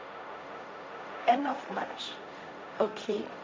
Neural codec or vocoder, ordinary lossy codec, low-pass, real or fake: codec, 16 kHz, 1.1 kbps, Voila-Tokenizer; none; none; fake